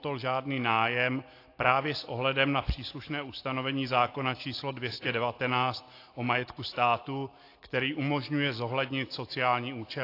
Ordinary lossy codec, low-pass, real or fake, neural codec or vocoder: AAC, 32 kbps; 5.4 kHz; real; none